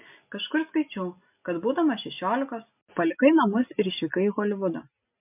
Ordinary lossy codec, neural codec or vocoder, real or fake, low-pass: MP3, 32 kbps; none; real; 3.6 kHz